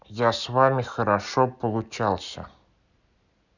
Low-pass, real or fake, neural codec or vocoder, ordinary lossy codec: 7.2 kHz; real; none; none